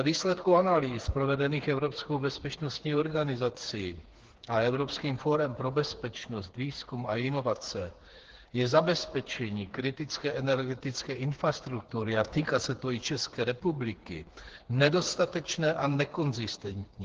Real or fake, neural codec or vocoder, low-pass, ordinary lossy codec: fake; codec, 16 kHz, 4 kbps, FreqCodec, smaller model; 7.2 kHz; Opus, 16 kbps